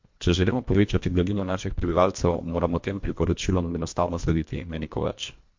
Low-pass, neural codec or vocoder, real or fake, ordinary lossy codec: 7.2 kHz; codec, 24 kHz, 1.5 kbps, HILCodec; fake; MP3, 48 kbps